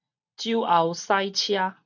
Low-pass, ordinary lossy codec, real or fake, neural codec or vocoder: 7.2 kHz; MP3, 64 kbps; real; none